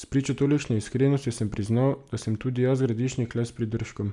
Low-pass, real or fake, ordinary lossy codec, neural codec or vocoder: 10.8 kHz; real; none; none